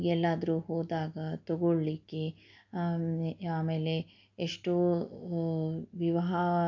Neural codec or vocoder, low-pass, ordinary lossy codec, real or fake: none; 7.2 kHz; AAC, 48 kbps; real